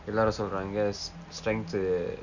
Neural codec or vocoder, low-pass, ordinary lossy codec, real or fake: none; 7.2 kHz; none; real